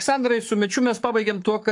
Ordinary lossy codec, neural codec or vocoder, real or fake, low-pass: AAC, 64 kbps; codec, 44.1 kHz, 7.8 kbps, Pupu-Codec; fake; 10.8 kHz